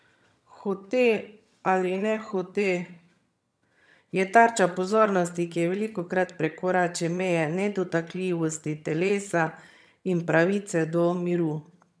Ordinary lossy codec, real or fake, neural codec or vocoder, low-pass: none; fake; vocoder, 22.05 kHz, 80 mel bands, HiFi-GAN; none